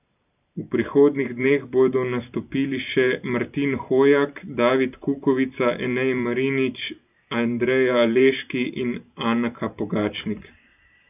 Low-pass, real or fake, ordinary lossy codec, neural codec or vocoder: 3.6 kHz; fake; none; vocoder, 44.1 kHz, 128 mel bands every 512 samples, BigVGAN v2